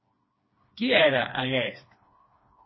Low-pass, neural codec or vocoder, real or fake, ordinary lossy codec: 7.2 kHz; codec, 16 kHz, 2 kbps, FreqCodec, smaller model; fake; MP3, 24 kbps